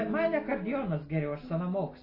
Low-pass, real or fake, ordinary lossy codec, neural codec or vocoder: 5.4 kHz; real; AAC, 24 kbps; none